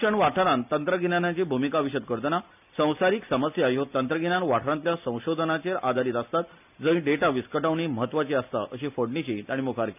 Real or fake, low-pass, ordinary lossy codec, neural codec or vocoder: real; 3.6 kHz; none; none